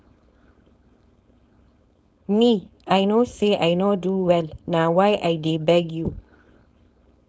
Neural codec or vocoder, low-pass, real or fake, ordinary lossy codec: codec, 16 kHz, 4.8 kbps, FACodec; none; fake; none